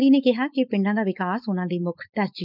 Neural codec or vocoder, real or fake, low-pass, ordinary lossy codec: codec, 16 kHz, 4.8 kbps, FACodec; fake; 5.4 kHz; none